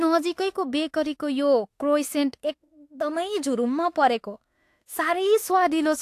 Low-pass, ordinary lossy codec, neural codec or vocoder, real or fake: 14.4 kHz; AAC, 64 kbps; autoencoder, 48 kHz, 32 numbers a frame, DAC-VAE, trained on Japanese speech; fake